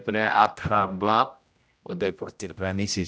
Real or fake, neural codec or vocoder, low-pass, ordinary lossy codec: fake; codec, 16 kHz, 0.5 kbps, X-Codec, HuBERT features, trained on general audio; none; none